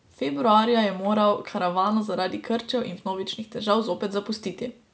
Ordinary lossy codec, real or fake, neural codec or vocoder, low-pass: none; real; none; none